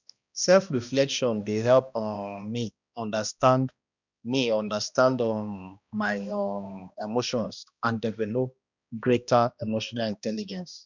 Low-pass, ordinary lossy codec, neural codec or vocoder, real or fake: 7.2 kHz; none; codec, 16 kHz, 1 kbps, X-Codec, HuBERT features, trained on balanced general audio; fake